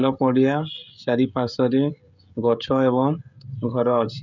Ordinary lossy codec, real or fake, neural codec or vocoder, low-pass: none; fake; codec, 16 kHz, 16 kbps, FreqCodec, smaller model; 7.2 kHz